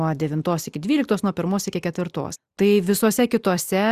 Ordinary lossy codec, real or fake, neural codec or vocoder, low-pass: Opus, 64 kbps; real; none; 14.4 kHz